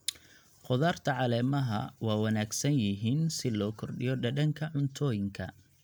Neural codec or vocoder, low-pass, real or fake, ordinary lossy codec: none; none; real; none